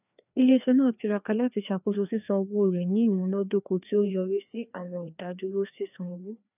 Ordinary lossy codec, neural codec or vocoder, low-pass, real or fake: none; codec, 16 kHz, 2 kbps, FreqCodec, larger model; 3.6 kHz; fake